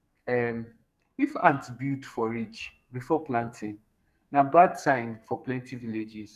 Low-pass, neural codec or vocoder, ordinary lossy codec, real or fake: 14.4 kHz; codec, 44.1 kHz, 2.6 kbps, SNAC; none; fake